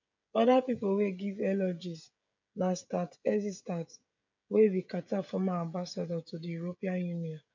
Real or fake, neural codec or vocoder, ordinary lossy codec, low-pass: fake; codec, 16 kHz, 16 kbps, FreqCodec, smaller model; MP3, 64 kbps; 7.2 kHz